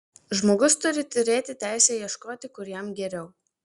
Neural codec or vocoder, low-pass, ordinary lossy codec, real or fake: none; 10.8 kHz; Opus, 64 kbps; real